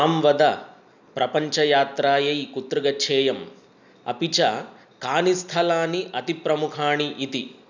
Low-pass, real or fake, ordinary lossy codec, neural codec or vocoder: 7.2 kHz; real; none; none